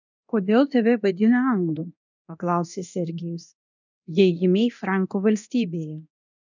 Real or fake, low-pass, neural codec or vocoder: fake; 7.2 kHz; codec, 24 kHz, 0.9 kbps, DualCodec